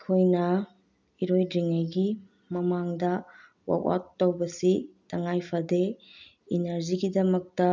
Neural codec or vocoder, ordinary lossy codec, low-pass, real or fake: none; none; 7.2 kHz; real